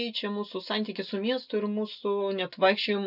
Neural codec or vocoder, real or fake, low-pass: none; real; 5.4 kHz